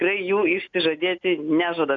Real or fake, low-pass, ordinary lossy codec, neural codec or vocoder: real; 7.2 kHz; MP3, 64 kbps; none